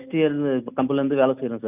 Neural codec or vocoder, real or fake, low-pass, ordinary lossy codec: none; real; 3.6 kHz; none